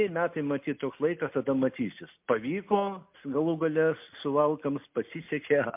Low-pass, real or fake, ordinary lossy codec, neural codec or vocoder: 3.6 kHz; real; MP3, 32 kbps; none